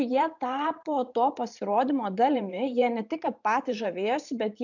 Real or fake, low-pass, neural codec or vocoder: fake; 7.2 kHz; vocoder, 44.1 kHz, 128 mel bands every 512 samples, BigVGAN v2